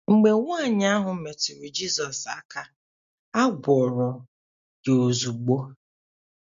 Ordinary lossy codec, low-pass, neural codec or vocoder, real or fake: MP3, 48 kbps; 7.2 kHz; none; real